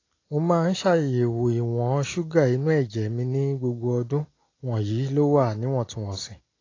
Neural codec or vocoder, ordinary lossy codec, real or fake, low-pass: none; AAC, 32 kbps; real; 7.2 kHz